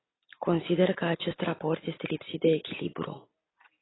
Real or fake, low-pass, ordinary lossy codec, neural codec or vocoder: real; 7.2 kHz; AAC, 16 kbps; none